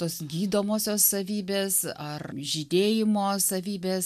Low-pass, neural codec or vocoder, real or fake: 14.4 kHz; none; real